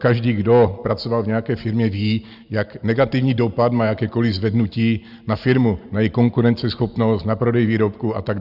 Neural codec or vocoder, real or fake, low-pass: none; real; 5.4 kHz